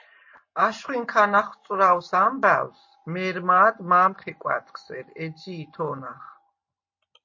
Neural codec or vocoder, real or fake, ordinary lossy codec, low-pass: none; real; MP3, 32 kbps; 7.2 kHz